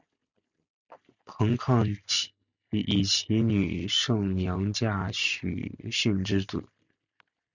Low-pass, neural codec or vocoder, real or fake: 7.2 kHz; none; real